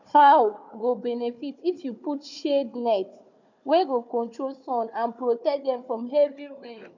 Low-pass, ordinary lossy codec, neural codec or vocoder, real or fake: 7.2 kHz; none; codec, 16 kHz, 4 kbps, FunCodec, trained on Chinese and English, 50 frames a second; fake